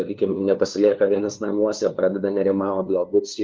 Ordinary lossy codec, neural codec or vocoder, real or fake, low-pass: Opus, 32 kbps; codec, 16 kHz, 2 kbps, FunCodec, trained on LibriTTS, 25 frames a second; fake; 7.2 kHz